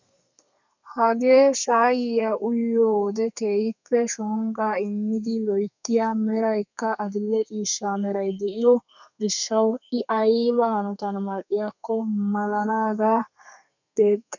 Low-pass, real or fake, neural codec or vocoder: 7.2 kHz; fake; codec, 32 kHz, 1.9 kbps, SNAC